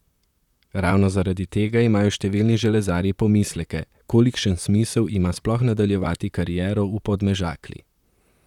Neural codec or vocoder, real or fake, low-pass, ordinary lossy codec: vocoder, 44.1 kHz, 128 mel bands, Pupu-Vocoder; fake; 19.8 kHz; none